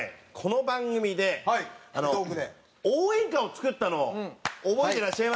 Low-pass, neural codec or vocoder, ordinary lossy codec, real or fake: none; none; none; real